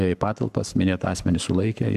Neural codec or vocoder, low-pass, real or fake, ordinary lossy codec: codec, 44.1 kHz, 7.8 kbps, Pupu-Codec; 14.4 kHz; fake; MP3, 96 kbps